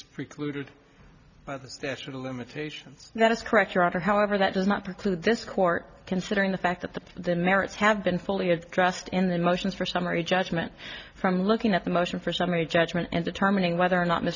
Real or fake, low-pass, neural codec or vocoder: real; 7.2 kHz; none